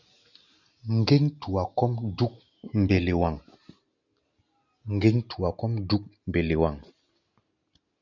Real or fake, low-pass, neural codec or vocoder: real; 7.2 kHz; none